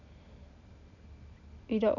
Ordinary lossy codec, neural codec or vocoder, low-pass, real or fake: none; none; 7.2 kHz; real